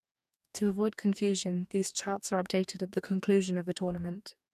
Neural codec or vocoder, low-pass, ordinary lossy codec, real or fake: codec, 44.1 kHz, 2.6 kbps, DAC; 14.4 kHz; none; fake